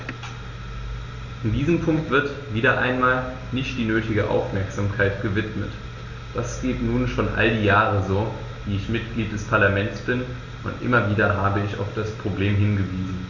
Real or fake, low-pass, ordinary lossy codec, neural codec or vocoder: real; 7.2 kHz; Opus, 64 kbps; none